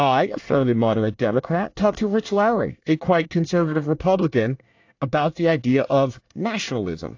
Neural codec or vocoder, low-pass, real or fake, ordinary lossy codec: codec, 24 kHz, 1 kbps, SNAC; 7.2 kHz; fake; AAC, 48 kbps